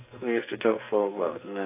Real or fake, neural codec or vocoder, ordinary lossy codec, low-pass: fake; codec, 44.1 kHz, 2.6 kbps, SNAC; AAC, 24 kbps; 3.6 kHz